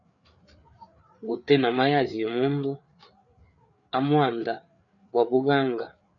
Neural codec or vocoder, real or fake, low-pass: codec, 16 kHz, 4 kbps, FreqCodec, larger model; fake; 7.2 kHz